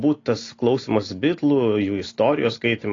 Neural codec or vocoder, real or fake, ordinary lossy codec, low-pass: none; real; AAC, 32 kbps; 7.2 kHz